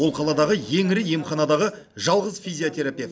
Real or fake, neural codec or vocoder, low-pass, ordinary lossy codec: real; none; none; none